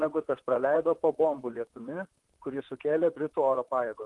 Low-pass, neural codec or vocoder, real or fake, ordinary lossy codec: 10.8 kHz; vocoder, 44.1 kHz, 128 mel bands, Pupu-Vocoder; fake; Opus, 24 kbps